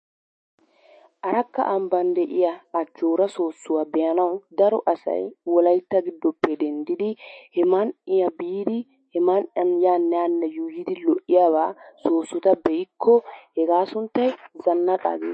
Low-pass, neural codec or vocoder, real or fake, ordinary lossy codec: 10.8 kHz; autoencoder, 48 kHz, 128 numbers a frame, DAC-VAE, trained on Japanese speech; fake; MP3, 32 kbps